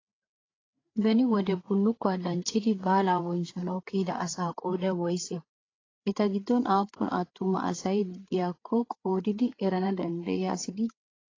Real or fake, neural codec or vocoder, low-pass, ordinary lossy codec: fake; codec, 16 kHz, 8 kbps, FreqCodec, larger model; 7.2 kHz; AAC, 32 kbps